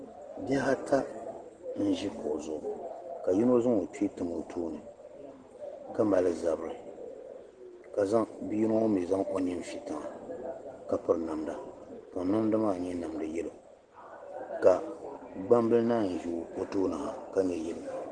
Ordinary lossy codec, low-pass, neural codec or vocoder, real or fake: Opus, 16 kbps; 9.9 kHz; none; real